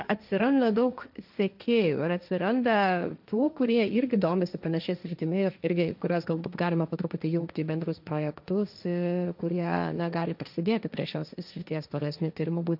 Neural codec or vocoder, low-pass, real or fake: codec, 16 kHz, 1.1 kbps, Voila-Tokenizer; 5.4 kHz; fake